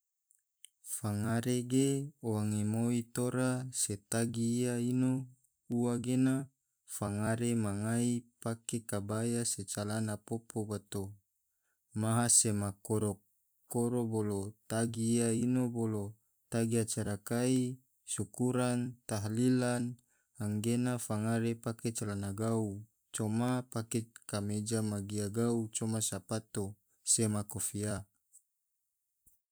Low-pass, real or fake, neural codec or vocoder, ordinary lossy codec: none; fake; vocoder, 44.1 kHz, 128 mel bands every 256 samples, BigVGAN v2; none